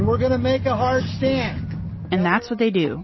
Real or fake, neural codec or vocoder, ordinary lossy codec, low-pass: real; none; MP3, 24 kbps; 7.2 kHz